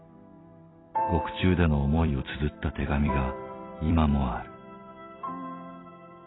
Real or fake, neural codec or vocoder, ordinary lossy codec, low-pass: fake; vocoder, 44.1 kHz, 128 mel bands every 256 samples, BigVGAN v2; AAC, 16 kbps; 7.2 kHz